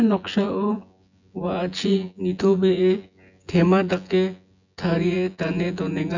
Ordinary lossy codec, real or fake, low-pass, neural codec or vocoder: none; fake; 7.2 kHz; vocoder, 24 kHz, 100 mel bands, Vocos